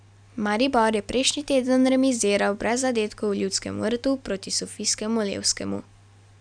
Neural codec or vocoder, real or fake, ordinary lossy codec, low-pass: none; real; none; 9.9 kHz